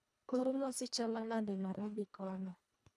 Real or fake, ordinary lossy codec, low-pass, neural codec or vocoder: fake; none; none; codec, 24 kHz, 1.5 kbps, HILCodec